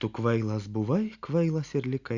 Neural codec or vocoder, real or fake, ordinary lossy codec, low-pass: none; real; Opus, 64 kbps; 7.2 kHz